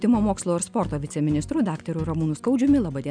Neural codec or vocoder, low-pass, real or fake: none; 9.9 kHz; real